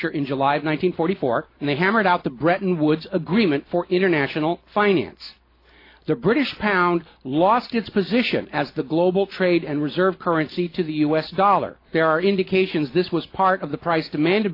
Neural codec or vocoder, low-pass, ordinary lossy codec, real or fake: none; 5.4 kHz; AAC, 32 kbps; real